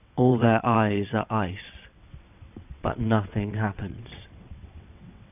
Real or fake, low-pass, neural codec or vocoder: fake; 3.6 kHz; vocoder, 22.05 kHz, 80 mel bands, WaveNeXt